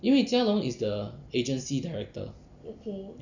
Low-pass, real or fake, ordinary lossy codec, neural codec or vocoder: 7.2 kHz; real; none; none